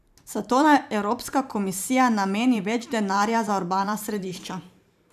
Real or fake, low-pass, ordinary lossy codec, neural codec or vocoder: fake; 14.4 kHz; none; vocoder, 44.1 kHz, 128 mel bands every 512 samples, BigVGAN v2